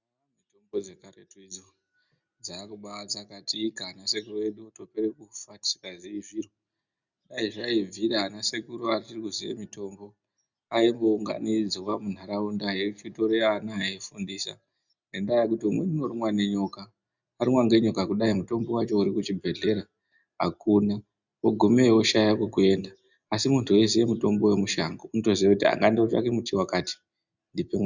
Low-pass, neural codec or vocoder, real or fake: 7.2 kHz; none; real